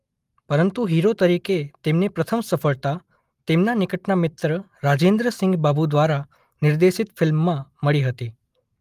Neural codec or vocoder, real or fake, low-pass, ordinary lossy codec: none; real; 14.4 kHz; Opus, 32 kbps